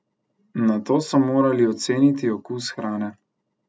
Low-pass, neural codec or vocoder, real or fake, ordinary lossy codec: none; none; real; none